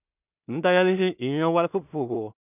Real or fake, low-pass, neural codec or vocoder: fake; 3.6 kHz; codec, 16 kHz in and 24 kHz out, 0.4 kbps, LongCat-Audio-Codec, two codebook decoder